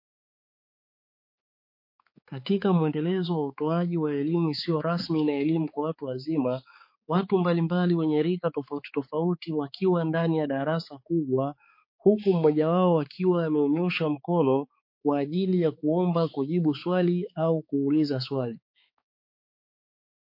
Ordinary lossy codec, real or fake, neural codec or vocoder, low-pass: MP3, 32 kbps; fake; codec, 16 kHz, 4 kbps, X-Codec, HuBERT features, trained on balanced general audio; 5.4 kHz